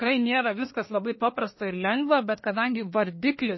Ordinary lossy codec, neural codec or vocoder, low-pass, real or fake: MP3, 24 kbps; codec, 24 kHz, 1 kbps, SNAC; 7.2 kHz; fake